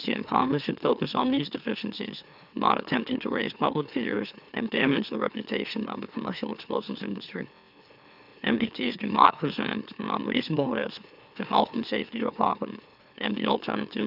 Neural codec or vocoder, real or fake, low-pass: autoencoder, 44.1 kHz, a latent of 192 numbers a frame, MeloTTS; fake; 5.4 kHz